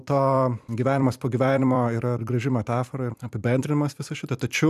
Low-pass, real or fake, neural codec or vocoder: 14.4 kHz; fake; vocoder, 44.1 kHz, 128 mel bands every 256 samples, BigVGAN v2